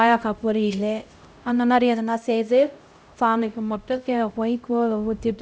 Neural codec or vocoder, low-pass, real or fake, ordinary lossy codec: codec, 16 kHz, 0.5 kbps, X-Codec, HuBERT features, trained on LibriSpeech; none; fake; none